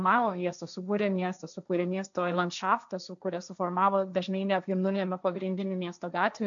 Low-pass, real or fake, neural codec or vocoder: 7.2 kHz; fake; codec, 16 kHz, 1.1 kbps, Voila-Tokenizer